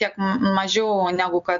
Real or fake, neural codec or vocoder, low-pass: real; none; 7.2 kHz